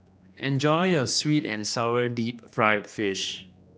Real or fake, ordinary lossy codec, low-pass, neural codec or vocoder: fake; none; none; codec, 16 kHz, 2 kbps, X-Codec, HuBERT features, trained on general audio